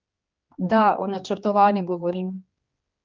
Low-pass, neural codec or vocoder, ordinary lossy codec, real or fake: 7.2 kHz; codec, 16 kHz, 2 kbps, X-Codec, HuBERT features, trained on general audio; Opus, 32 kbps; fake